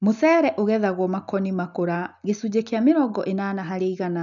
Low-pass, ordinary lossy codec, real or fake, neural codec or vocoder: 7.2 kHz; none; real; none